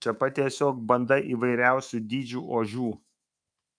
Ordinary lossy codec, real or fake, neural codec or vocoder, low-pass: MP3, 96 kbps; fake; codec, 24 kHz, 3.1 kbps, DualCodec; 9.9 kHz